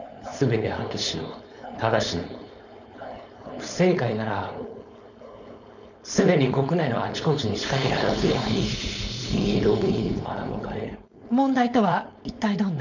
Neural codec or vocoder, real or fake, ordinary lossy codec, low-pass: codec, 16 kHz, 4.8 kbps, FACodec; fake; none; 7.2 kHz